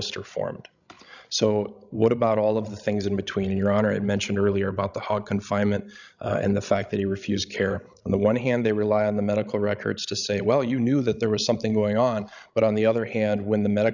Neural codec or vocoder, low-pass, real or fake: codec, 16 kHz, 16 kbps, FreqCodec, larger model; 7.2 kHz; fake